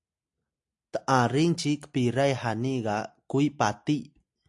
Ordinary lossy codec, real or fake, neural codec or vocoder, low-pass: AAC, 64 kbps; real; none; 10.8 kHz